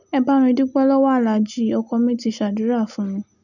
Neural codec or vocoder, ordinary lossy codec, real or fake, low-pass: none; none; real; 7.2 kHz